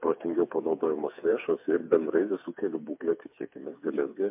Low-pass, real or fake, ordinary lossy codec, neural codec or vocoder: 3.6 kHz; fake; MP3, 32 kbps; codec, 16 kHz, 4 kbps, FreqCodec, smaller model